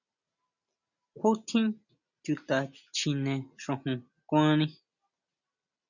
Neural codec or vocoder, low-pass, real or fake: none; 7.2 kHz; real